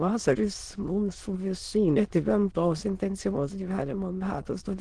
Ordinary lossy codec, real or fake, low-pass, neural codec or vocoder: Opus, 16 kbps; fake; 9.9 kHz; autoencoder, 22.05 kHz, a latent of 192 numbers a frame, VITS, trained on many speakers